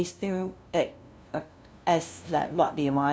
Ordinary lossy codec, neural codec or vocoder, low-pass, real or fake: none; codec, 16 kHz, 0.5 kbps, FunCodec, trained on LibriTTS, 25 frames a second; none; fake